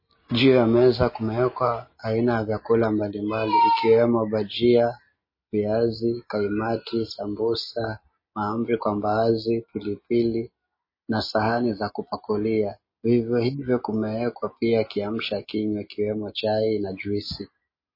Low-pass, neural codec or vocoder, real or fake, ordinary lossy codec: 5.4 kHz; none; real; MP3, 24 kbps